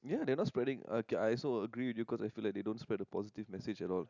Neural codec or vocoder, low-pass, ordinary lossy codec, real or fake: none; 7.2 kHz; none; real